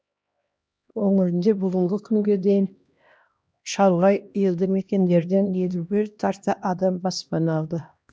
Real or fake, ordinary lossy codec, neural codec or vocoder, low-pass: fake; none; codec, 16 kHz, 1 kbps, X-Codec, HuBERT features, trained on LibriSpeech; none